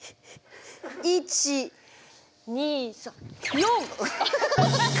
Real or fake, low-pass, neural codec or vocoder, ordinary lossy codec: real; none; none; none